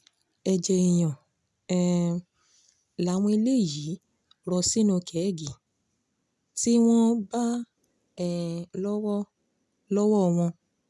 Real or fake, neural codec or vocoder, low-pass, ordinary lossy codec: real; none; none; none